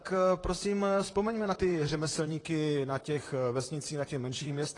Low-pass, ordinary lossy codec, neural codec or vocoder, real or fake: 10.8 kHz; AAC, 32 kbps; vocoder, 44.1 kHz, 128 mel bands, Pupu-Vocoder; fake